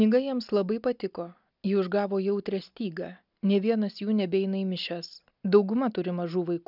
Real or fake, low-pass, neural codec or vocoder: real; 5.4 kHz; none